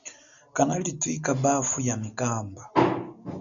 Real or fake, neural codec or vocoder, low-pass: real; none; 7.2 kHz